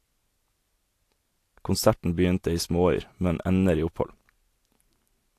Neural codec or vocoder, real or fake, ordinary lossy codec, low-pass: none; real; AAC, 64 kbps; 14.4 kHz